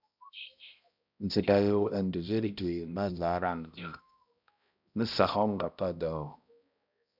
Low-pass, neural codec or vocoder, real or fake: 5.4 kHz; codec, 16 kHz, 0.5 kbps, X-Codec, HuBERT features, trained on balanced general audio; fake